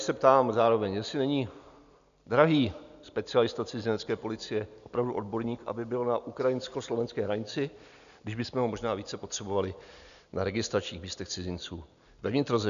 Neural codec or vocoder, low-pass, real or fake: none; 7.2 kHz; real